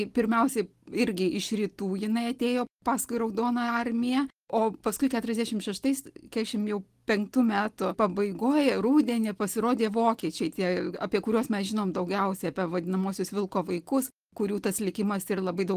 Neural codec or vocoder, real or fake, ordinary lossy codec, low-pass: none; real; Opus, 24 kbps; 14.4 kHz